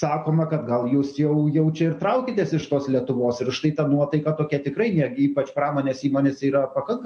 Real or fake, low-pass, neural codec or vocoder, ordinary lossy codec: real; 10.8 kHz; none; MP3, 48 kbps